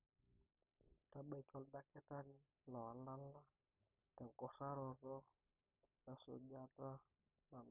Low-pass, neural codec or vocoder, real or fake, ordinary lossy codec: 3.6 kHz; none; real; none